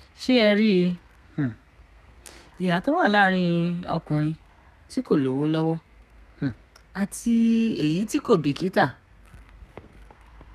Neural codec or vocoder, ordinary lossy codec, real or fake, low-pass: codec, 32 kHz, 1.9 kbps, SNAC; none; fake; 14.4 kHz